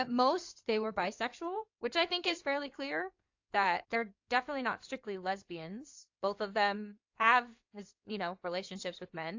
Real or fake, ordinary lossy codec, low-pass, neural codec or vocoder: fake; AAC, 48 kbps; 7.2 kHz; codec, 16 kHz in and 24 kHz out, 2.2 kbps, FireRedTTS-2 codec